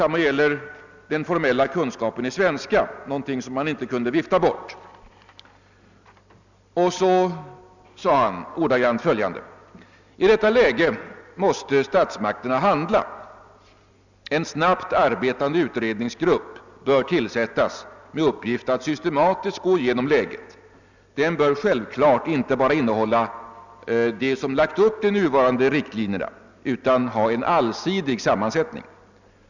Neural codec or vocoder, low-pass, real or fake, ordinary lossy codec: none; 7.2 kHz; real; none